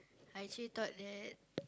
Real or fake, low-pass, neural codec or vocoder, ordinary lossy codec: real; none; none; none